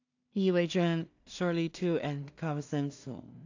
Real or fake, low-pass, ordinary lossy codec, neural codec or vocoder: fake; 7.2 kHz; AAC, 48 kbps; codec, 16 kHz in and 24 kHz out, 0.4 kbps, LongCat-Audio-Codec, two codebook decoder